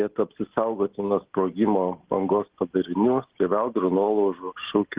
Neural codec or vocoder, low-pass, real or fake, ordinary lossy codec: none; 3.6 kHz; real; Opus, 16 kbps